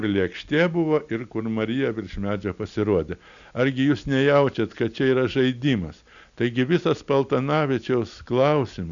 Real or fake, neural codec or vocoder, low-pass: real; none; 7.2 kHz